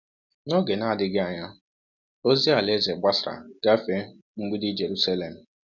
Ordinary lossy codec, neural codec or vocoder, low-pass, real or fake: none; none; 7.2 kHz; real